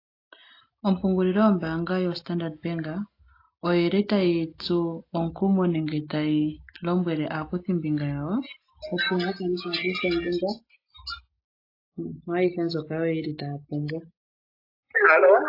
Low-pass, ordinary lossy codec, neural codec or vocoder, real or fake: 5.4 kHz; AAC, 32 kbps; none; real